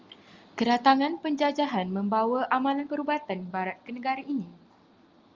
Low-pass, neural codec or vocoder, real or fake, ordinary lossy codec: 7.2 kHz; none; real; Opus, 32 kbps